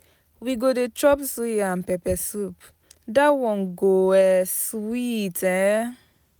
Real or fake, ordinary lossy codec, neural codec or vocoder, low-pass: real; none; none; none